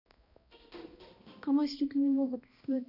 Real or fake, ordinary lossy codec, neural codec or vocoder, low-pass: fake; none; codec, 16 kHz, 1 kbps, X-Codec, HuBERT features, trained on balanced general audio; 5.4 kHz